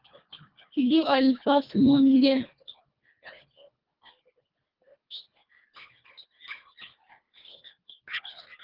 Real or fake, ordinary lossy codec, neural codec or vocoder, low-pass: fake; Opus, 32 kbps; codec, 24 kHz, 1.5 kbps, HILCodec; 5.4 kHz